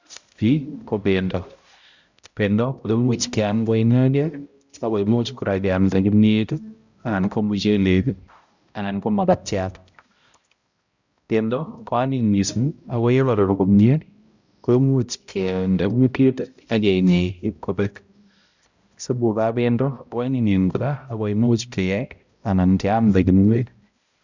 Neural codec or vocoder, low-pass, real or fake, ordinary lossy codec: codec, 16 kHz, 0.5 kbps, X-Codec, HuBERT features, trained on balanced general audio; 7.2 kHz; fake; Opus, 64 kbps